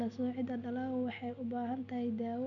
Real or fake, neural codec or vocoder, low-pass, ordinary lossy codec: real; none; 7.2 kHz; none